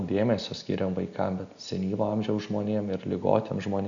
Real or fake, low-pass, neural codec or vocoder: real; 7.2 kHz; none